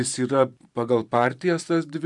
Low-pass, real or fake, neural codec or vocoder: 10.8 kHz; fake; vocoder, 44.1 kHz, 128 mel bands every 512 samples, BigVGAN v2